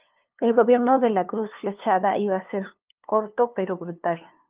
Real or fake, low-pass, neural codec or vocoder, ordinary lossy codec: fake; 3.6 kHz; codec, 16 kHz, 2 kbps, FunCodec, trained on LibriTTS, 25 frames a second; Opus, 24 kbps